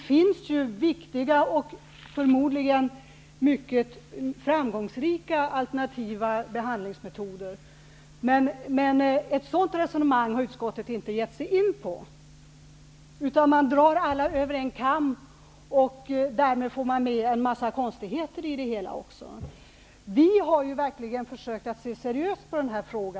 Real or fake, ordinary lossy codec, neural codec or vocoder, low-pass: real; none; none; none